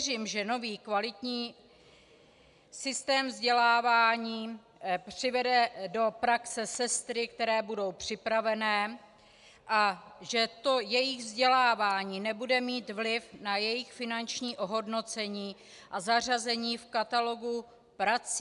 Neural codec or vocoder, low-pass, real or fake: none; 10.8 kHz; real